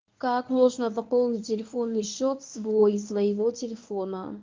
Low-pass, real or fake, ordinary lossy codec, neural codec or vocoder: 7.2 kHz; fake; Opus, 32 kbps; codec, 24 kHz, 0.9 kbps, WavTokenizer, medium speech release version 1